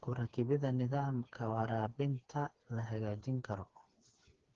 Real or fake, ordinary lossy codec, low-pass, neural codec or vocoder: fake; Opus, 16 kbps; 7.2 kHz; codec, 16 kHz, 4 kbps, FreqCodec, smaller model